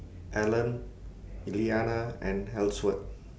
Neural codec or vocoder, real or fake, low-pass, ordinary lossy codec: none; real; none; none